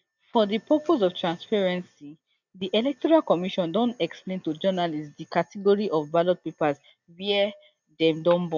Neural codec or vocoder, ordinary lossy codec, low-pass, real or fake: none; none; 7.2 kHz; real